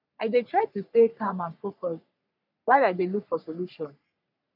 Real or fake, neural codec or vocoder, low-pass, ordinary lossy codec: fake; codec, 44.1 kHz, 3.4 kbps, Pupu-Codec; 5.4 kHz; none